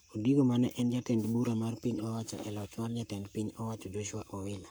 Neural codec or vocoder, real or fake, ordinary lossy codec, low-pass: codec, 44.1 kHz, 7.8 kbps, Pupu-Codec; fake; none; none